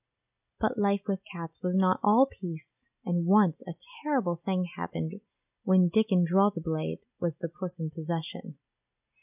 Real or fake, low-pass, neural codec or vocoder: real; 3.6 kHz; none